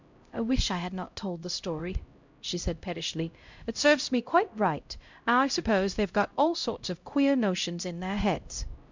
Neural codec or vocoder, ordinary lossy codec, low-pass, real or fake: codec, 16 kHz, 0.5 kbps, X-Codec, HuBERT features, trained on LibriSpeech; MP3, 64 kbps; 7.2 kHz; fake